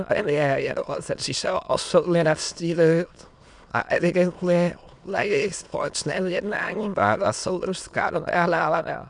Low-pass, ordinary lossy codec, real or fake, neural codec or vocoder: 9.9 kHz; MP3, 96 kbps; fake; autoencoder, 22.05 kHz, a latent of 192 numbers a frame, VITS, trained on many speakers